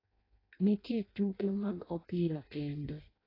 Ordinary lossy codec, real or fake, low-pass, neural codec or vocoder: AAC, 24 kbps; fake; 5.4 kHz; codec, 16 kHz in and 24 kHz out, 0.6 kbps, FireRedTTS-2 codec